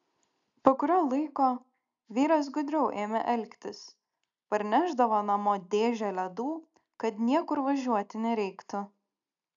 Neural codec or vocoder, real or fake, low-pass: none; real; 7.2 kHz